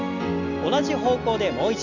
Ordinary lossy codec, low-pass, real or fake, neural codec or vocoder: none; 7.2 kHz; real; none